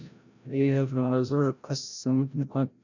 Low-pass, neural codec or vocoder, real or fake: 7.2 kHz; codec, 16 kHz, 0.5 kbps, FreqCodec, larger model; fake